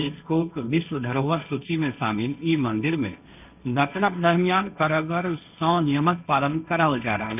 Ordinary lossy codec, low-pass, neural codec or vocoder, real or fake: none; 3.6 kHz; codec, 16 kHz, 1.1 kbps, Voila-Tokenizer; fake